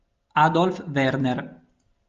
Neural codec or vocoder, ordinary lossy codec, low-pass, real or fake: none; Opus, 32 kbps; 7.2 kHz; real